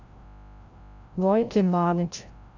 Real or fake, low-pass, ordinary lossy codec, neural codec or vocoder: fake; 7.2 kHz; AAC, 48 kbps; codec, 16 kHz, 0.5 kbps, FreqCodec, larger model